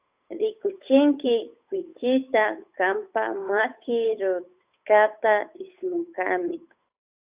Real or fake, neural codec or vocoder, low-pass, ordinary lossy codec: fake; codec, 16 kHz, 8 kbps, FunCodec, trained on Chinese and English, 25 frames a second; 3.6 kHz; Opus, 64 kbps